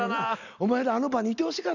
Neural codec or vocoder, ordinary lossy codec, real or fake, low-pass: none; none; real; 7.2 kHz